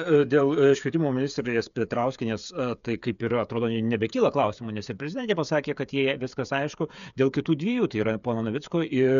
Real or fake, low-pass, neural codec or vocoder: fake; 7.2 kHz; codec, 16 kHz, 8 kbps, FreqCodec, smaller model